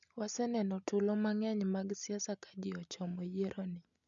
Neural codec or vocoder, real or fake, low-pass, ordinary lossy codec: none; real; 7.2 kHz; none